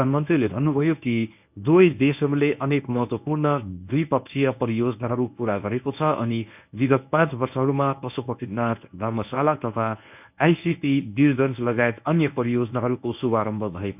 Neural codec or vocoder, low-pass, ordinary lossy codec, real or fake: codec, 24 kHz, 0.9 kbps, WavTokenizer, medium speech release version 1; 3.6 kHz; none; fake